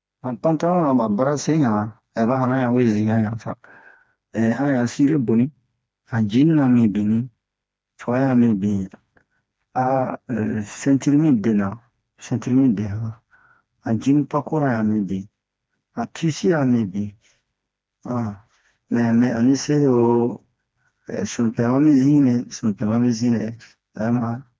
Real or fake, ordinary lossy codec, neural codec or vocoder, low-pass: fake; none; codec, 16 kHz, 2 kbps, FreqCodec, smaller model; none